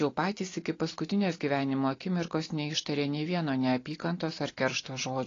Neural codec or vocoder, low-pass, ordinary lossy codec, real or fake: none; 7.2 kHz; AAC, 32 kbps; real